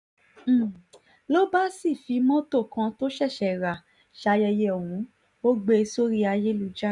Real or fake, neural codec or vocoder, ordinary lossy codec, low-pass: real; none; none; 10.8 kHz